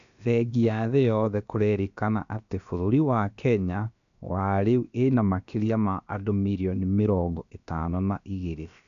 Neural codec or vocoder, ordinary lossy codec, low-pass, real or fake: codec, 16 kHz, about 1 kbps, DyCAST, with the encoder's durations; none; 7.2 kHz; fake